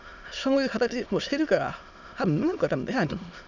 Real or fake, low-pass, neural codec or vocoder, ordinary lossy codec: fake; 7.2 kHz; autoencoder, 22.05 kHz, a latent of 192 numbers a frame, VITS, trained on many speakers; none